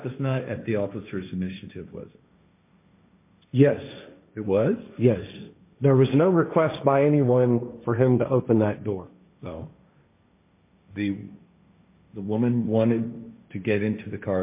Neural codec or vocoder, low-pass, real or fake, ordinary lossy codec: codec, 16 kHz, 1.1 kbps, Voila-Tokenizer; 3.6 kHz; fake; MP3, 24 kbps